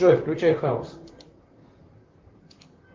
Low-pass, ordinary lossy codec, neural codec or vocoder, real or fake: 7.2 kHz; Opus, 24 kbps; vocoder, 44.1 kHz, 128 mel bands, Pupu-Vocoder; fake